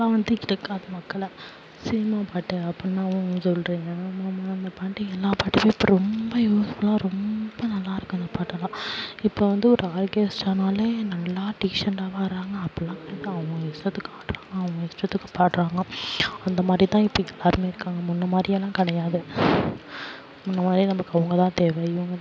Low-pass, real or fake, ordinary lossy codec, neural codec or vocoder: none; real; none; none